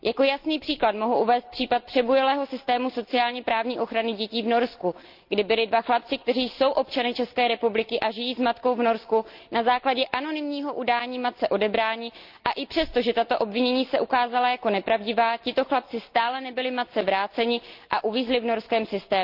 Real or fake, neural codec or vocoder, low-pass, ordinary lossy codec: real; none; 5.4 kHz; Opus, 24 kbps